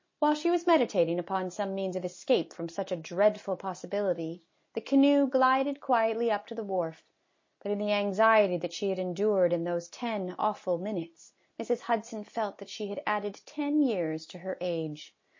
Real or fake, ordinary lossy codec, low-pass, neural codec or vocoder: real; MP3, 32 kbps; 7.2 kHz; none